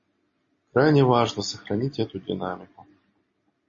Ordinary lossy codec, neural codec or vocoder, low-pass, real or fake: MP3, 32 kbps; none; 7.2 kHz; real